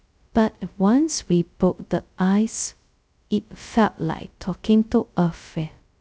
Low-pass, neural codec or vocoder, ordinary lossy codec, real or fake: none; codec, 16 kHz, 0.2 kbps, FocalCodec; none; fake